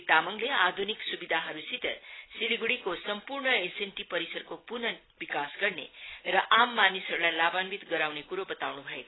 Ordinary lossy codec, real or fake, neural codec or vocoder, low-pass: AAC, 16 kbps; real; none; 7.2 kHz